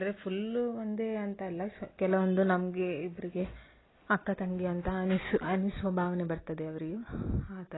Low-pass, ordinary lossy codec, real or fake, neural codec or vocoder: 7.2 kHz; AAC, 16 kbps; real; none